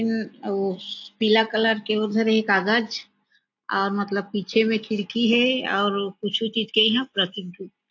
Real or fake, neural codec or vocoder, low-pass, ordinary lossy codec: real; none; 7.2 kHz; AAC, 48 kbps